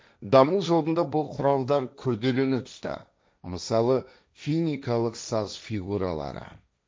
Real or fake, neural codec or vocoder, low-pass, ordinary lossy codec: fake; codec, 16 kHz, 1.1 kbps, Voila-Tokenizer; none; none